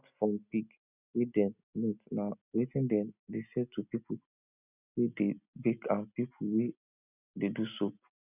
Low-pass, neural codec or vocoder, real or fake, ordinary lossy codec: 3.6 kHz; none; real; none